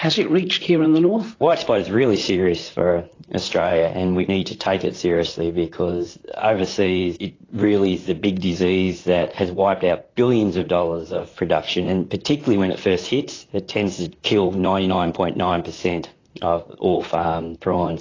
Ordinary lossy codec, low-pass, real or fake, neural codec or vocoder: AAC, 32 kbps; 7.2 kHz; fake; vocoder, 44.1 kHz, 128 mel bands, Pupu-Vocoder